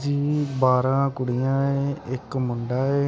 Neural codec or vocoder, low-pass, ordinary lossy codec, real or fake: none; none; none; real